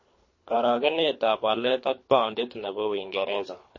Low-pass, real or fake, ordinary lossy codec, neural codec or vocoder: 7.2 kHz; fake; MP3, 32 kbps; codec, 24 kHz, 3 kbps, HILCodec